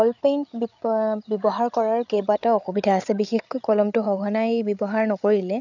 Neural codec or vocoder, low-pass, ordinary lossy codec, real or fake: codec, 16 kHz, 8 kbps, FreqCodec, larger model; 7.2 kHz; none; fake